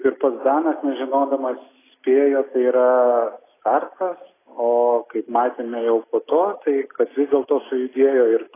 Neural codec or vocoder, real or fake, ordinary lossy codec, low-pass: none; real; AAC, 16 kbps; 3.6 kHz